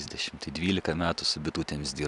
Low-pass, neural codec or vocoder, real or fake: 10.8 kHz; none; real